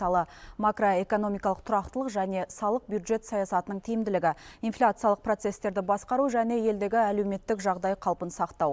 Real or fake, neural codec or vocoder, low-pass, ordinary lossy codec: real; none; none; none